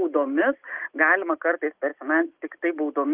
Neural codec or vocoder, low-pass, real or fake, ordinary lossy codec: none; 3.6 kHz; real; Opus, 24 kbps